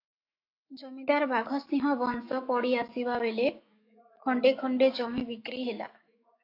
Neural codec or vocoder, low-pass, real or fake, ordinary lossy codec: autoencoder, 48 kHz, 128 numbers a frame, DAC-VAE, trained on Japanese speech; 5.4 kHz; fake; AAC, 32 kbps